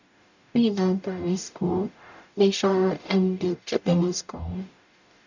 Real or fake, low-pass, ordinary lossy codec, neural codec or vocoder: fake; 7.2 kHz; none; codec, 44.1 kHz, 0.9 kbps, DAC